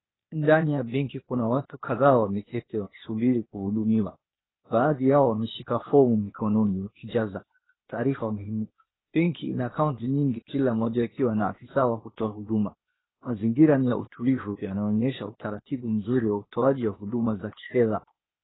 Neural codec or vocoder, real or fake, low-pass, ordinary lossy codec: codec, 16 kHz, 0.8 kbps, ZipCodec; fake; 7.2 kHz; AAC, 16 kbps